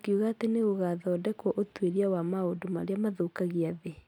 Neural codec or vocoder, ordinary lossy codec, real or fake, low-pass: none; none; real; 19.8 kHz